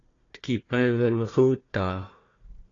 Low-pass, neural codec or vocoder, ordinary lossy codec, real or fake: 7.2 kHz; codec, 16 kHz, 1 kbps, FunCodec, trained on Chinese and English, 50 frames a second; AAC, 32 kbps; fake